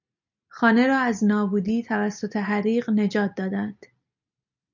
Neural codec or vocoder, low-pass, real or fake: none; 7.2 kHz; real